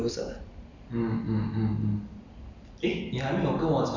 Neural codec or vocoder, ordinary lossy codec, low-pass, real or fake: none; none; 7.2 kHz; real